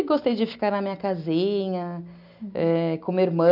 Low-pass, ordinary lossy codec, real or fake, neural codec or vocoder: 5.4 kHz; MP3, 48 kbps; real; none